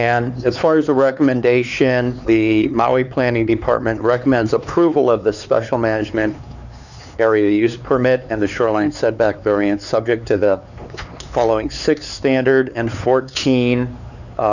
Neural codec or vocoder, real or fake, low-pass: codec, 16 kHz, 4 kbps, X-Codec, HuBERT features, trained on LibriSpeech; fake; 7.2 kHz